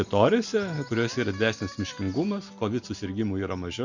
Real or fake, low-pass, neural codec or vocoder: real; 7.2 kHz; none